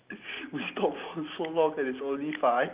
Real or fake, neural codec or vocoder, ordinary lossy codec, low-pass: real; none; Opus, 24 kbps; 3.6 kHz